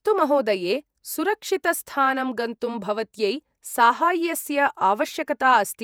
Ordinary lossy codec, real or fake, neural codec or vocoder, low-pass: none; fake; vocoder, 48 kHz, 128 mel bands, Vocos; none